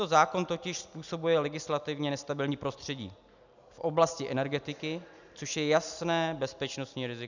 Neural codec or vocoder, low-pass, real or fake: none; 7.2 kHz; real